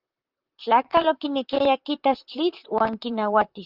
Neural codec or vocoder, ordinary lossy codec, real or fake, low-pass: vocoder, 44.1 kHz, 128 mel bands, Pupu-Vocoder; Opus, 24 kbps; fake; 5.4 kHz